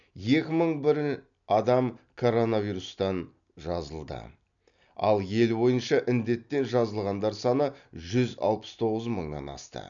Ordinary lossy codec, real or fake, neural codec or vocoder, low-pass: none; real; none; 7.2 kHz